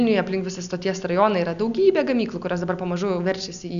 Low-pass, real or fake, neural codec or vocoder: 7.2 kHz; real; none